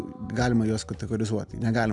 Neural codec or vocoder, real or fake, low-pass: none; real; 10.8 kHz